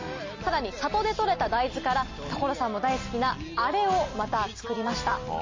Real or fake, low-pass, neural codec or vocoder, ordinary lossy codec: real; 7.2 kHz; none; MP3, 32 kbps